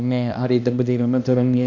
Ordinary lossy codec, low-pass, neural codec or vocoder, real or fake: AAC, 48 kbps; 7.2 kHz; codec, 16 kHz, 1 kbps, X-Codec, HuBERT features, trained on balanced general audio; fake